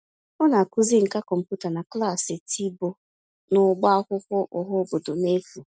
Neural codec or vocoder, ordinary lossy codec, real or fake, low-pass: none; none; real; none